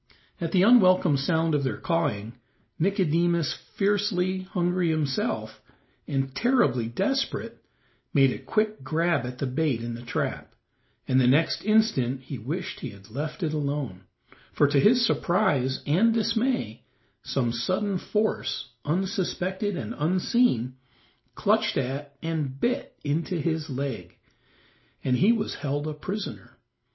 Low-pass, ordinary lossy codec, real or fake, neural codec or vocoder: 7.2 kHz; MP3, 24 kbps; real; none